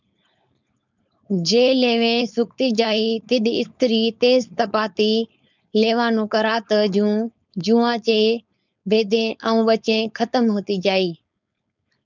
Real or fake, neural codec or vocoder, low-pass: fake; codec, 16 kHz, 4.8 kbps, FACodec; 7.2 kHz